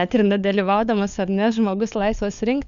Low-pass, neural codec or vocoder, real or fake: 7.2 kHz; codec, 16 kHz, 4 kbps, FunCodec, trained on LibriTTS, 50 frames a second; fake